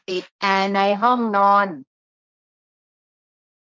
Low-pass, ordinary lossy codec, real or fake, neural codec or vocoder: none; none; fake; codec, 16 kHz, 1.1 kbps, Voila-Tokenizer